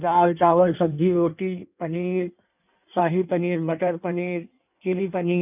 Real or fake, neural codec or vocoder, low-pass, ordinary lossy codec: fake; codec, 16 kHz in and 24 kHz out, 1.1 kbps, FireRedTTS-2 codec; 3.6 kHz; none